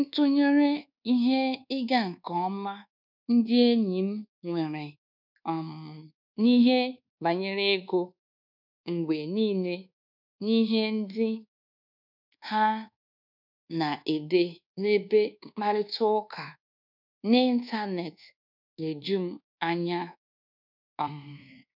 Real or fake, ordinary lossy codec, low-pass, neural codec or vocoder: fake; none; 5.4 kHz; codec, 24 kHz, 1.2 kbps, DualCodec